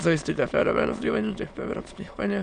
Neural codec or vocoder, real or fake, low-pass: autoencoder, 22.05 kHz, a latent of 192 numbers a frame, VITS, trained on many speakers; fake; 9.9 kHz